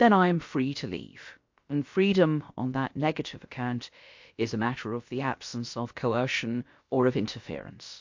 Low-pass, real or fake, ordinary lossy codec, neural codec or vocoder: 7.2 kHz; fake; MP3, 48 kbps; codec, 16 kHz, about 1 kbps, DyCAST, with the encoder's durations